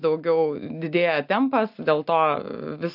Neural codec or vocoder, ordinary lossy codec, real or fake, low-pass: none; AAC, 48 kbps; real; 5.4 kHz